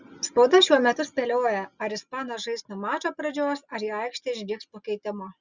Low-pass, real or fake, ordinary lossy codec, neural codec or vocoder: 7.2 kHz; real; Opus, 64 kbps; none